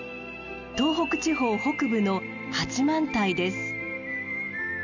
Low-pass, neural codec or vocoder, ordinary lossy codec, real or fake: 7.2 kHz; none; none; real